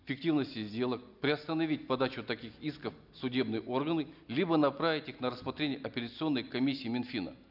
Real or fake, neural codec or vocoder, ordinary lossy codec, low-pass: real; none; none; 5.4 kHz